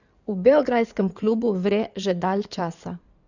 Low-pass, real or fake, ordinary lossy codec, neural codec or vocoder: 7.2 kHz; fake; none; codec, 16 kHz in and 24 kHz out, 2.2 kbps, FireRedTTS-2 codec